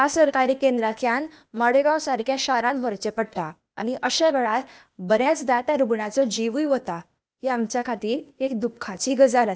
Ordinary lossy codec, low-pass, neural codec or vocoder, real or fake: none; none; codec, 16 kHz, 0.8 kbps, ZipCodec; fake